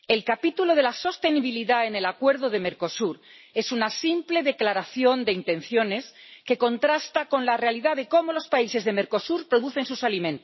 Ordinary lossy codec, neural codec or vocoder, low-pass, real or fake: MP3, 24 kbps; none; 7.2 kHz; real